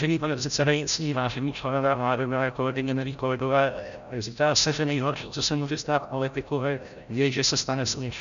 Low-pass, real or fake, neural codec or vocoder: 7.2 kHz; fake; codec, 16 kHz, 0.5 kbps, FreqCodec, larger model